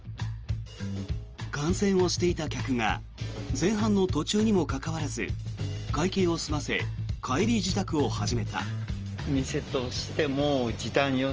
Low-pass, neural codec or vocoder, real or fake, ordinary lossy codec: 7.2 kHz; none; real; Opus, 24 kbps